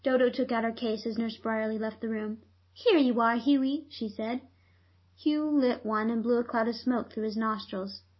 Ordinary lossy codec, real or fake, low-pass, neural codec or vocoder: MP3, 24 kbps; real; 7.2 kHz; none